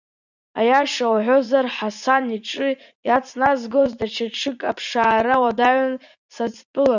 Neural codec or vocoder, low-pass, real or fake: vocoder, 44.1 kHz, 80 mel bands, Vocos; 7.2 kHz; fake